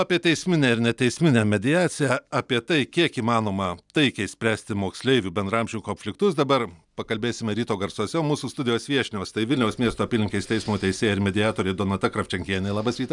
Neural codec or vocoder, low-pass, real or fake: none; 10.8 kHz; real